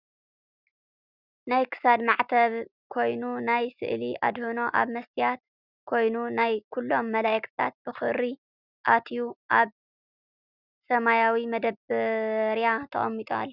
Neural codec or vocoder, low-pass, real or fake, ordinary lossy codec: none; 5.4 kHz; real; Opus, 64 kbps